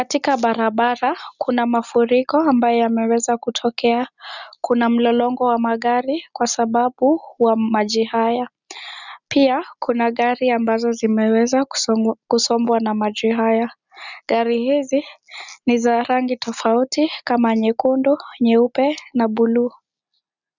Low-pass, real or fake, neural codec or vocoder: 7.2 kHz; real; none